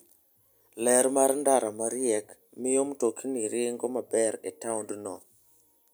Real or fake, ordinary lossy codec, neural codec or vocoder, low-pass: real; none; none; none